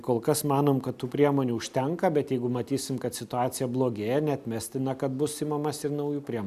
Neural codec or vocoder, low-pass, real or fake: none; 14.4 kHz; real